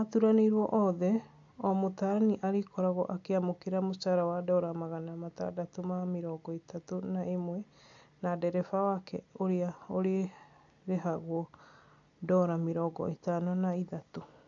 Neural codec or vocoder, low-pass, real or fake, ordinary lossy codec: none; 7.2 kHz; real; AAC, 64 kbps